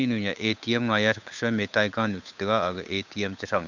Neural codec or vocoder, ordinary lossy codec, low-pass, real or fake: codec, 16 kHz, 8 kbps, FunCodec, trained on Chinese and English, 25 frames a second; none; 7.2 kHz; fake